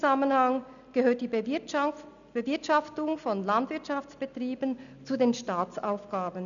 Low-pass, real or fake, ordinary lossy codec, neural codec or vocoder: 7.2 kHz; real; none; none